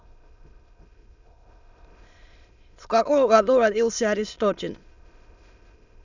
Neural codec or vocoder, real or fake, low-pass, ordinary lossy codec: autoencoder, 22.05 kHz, a latent of 192 numbers a frame, VITS, trained on many speakers; fake; 7.2 kHz; none